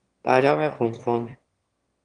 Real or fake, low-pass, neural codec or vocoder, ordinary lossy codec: fake; 9.9 kHz; autoencoder, 22.05 kHz, a latent of 192 numbers a frame, VITS, trained on one speaker; Opus, 24 kbps